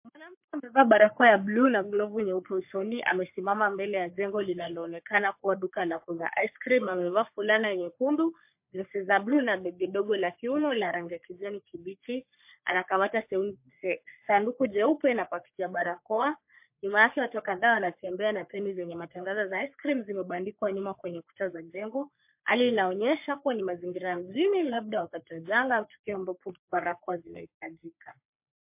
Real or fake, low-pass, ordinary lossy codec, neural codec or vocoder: fake; 3.6 kHz; MP3, 32 kbps; codec, 44.1 kHz, 3.4 kbps, Pupu-Codec